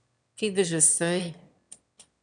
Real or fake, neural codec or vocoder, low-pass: fake; autoencoder, 22.05 kHz, a latent of 192 numbers a frame, VITS, trained on one speaker; 9.9 kHz